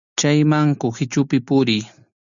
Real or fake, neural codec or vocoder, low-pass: real; none; 7.2 kHz